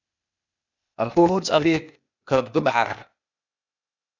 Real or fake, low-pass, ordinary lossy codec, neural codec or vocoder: fake; 7.2 kHz; MP3, 64 kbps; codec, 16 kHz, 0.8 kbps, ZipCodec